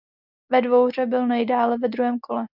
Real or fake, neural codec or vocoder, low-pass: real; none; 5.4 kHz